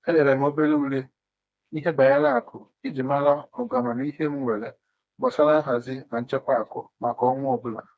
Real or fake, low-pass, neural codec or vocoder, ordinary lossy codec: fake; none; codec, 16 kHz, 2 kbps, FreqCodec, smaller model; none